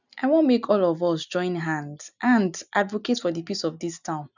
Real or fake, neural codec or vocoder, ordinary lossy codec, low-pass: real; none; none; 7.2 kHz